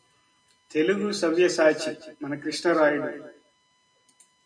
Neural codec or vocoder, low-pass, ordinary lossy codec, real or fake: none; 9.9 kHz; MP3, 96 kbps; real